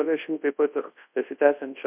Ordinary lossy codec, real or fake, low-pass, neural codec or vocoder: MP3, 32 kbps; fake; 3.6 kHz; codec, 24 kHz, 0.9 kbps, WavTokenizer, large speech release